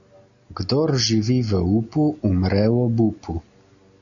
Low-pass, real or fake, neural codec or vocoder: 7.2 kHz; real; none